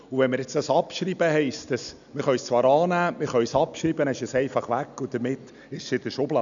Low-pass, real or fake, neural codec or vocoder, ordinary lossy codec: 7.2 kHz; real; none; none